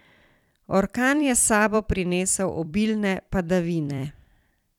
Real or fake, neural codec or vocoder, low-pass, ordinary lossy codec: fake; vocoder, 44.1 kHz, 128 mel bands every 256 samples, BigVGAN v2; 19.8 kHz; none